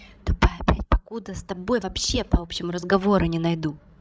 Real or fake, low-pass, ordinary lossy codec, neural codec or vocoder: fake; none; none; codec, 16 kHz, 16 kbps, FreqCodec, larger model